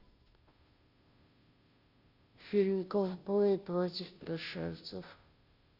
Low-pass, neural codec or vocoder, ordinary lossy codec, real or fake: 5.4 kHz; codec, 16 kHz, 0.5 kbps, FunCodec, trained on Chinese and English, 25 frames a second; Opus, 64 kbps; fake